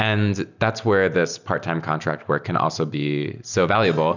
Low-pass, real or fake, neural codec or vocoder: 7.2 kHz; real; none